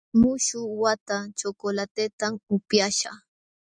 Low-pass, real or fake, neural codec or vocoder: 9.9 kHz; real; none